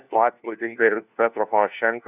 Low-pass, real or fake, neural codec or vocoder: 3.6 kHz; fake; codec, 16 kHz, 1 kbps, FunCodec, trained on LibriTTS, 50 frames a second